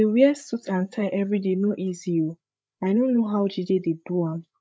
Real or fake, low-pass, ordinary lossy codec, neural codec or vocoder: fake; none; none; codec, 16 kHz, 8 kbps, FreqCodec, larger model